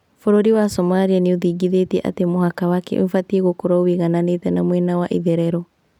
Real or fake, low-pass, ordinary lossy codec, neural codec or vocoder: real; 19.8 kHz; none; none